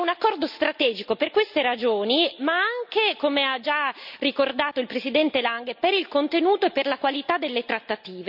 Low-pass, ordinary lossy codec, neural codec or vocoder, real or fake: 5.4 kHz; none; none; real